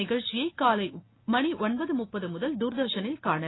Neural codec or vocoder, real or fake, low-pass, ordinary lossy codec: none; real; 7.2 kHz; AAC, 16 kbps